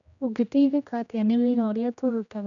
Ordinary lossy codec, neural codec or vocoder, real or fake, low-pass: none; codec, 16 kHz, 1 kbps, X-Codec, HuBERT features, trained on general audio; fake; 7.2 kHz